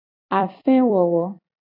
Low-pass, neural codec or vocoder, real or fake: 5.4 kHz; vocoder, 44.1 kHz, 128 mel bands every 256 samples, BigVGAN v2; fake